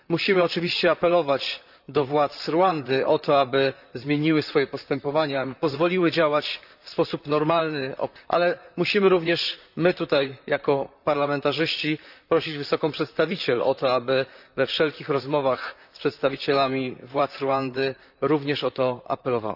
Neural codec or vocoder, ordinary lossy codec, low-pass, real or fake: vocoder, 44.1 kHz, 128 mel bands, Pupu-Vocoder; none; 5.4 kHz; fake